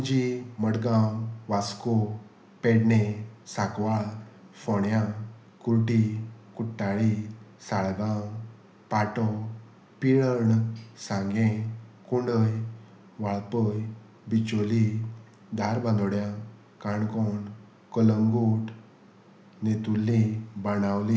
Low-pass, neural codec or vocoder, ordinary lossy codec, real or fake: none; none; none; real